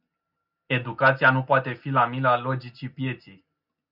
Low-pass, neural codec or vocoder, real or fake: 5.4 kHz; none; real